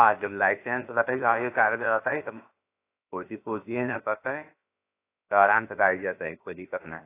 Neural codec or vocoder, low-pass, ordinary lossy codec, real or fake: codec, 16 kHz, about 1 kbps, DyCAST, with the encoder's durations; 3.6 kHz; AAC, 24 kbps; fake